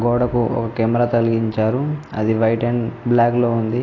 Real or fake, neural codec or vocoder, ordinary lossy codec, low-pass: real; none; AAC, 32 kbps; 7.2 kHz